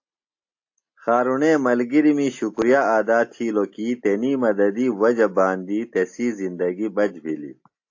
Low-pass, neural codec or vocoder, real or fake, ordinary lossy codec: 7.2 kHz; none; real; AAC, 48 kbps